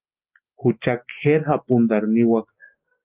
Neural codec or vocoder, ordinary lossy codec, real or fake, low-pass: none; Opus, 32 kbps; real; 3.6 kHz